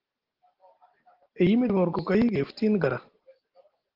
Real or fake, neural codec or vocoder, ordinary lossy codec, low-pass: real; none; Opus, 16 kbps; 5.4 kHz